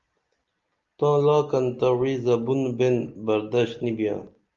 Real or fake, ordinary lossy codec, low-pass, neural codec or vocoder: real; Opus, 32 kbps; 7.2 kHz; none